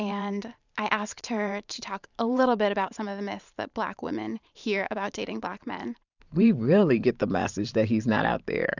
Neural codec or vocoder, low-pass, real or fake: vocoder, 44.1 kHz, 128 mel bands every 512 samples, BigVGAN v2; 7.2 kHz; fake